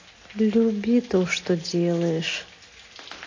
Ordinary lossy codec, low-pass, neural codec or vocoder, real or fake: AAC, 32 kbps; 7.2 kHz; none; real